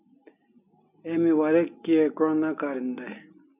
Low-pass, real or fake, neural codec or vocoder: 3.6 kHz; real; none